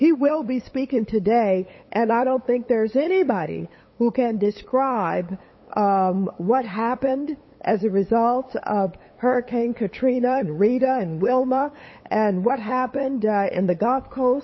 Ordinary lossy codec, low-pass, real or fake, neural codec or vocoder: MP3, 24 kbps; 7.2 kHz; fake; codec, 16 kHz, 8 kbps, FunCodec, trained on LibriTTS, 25 frames a second